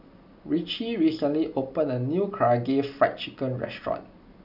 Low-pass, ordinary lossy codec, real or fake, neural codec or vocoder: 5.4 kHz; none; real; none